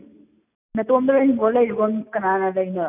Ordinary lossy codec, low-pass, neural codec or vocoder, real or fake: none; 3.6 kHz; none; real